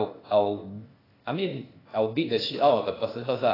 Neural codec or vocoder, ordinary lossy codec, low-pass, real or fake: codec, 16 kHz, 0.8 kbps, ZipCodec; AAC, 24 kbps; 5.4 kHz; fake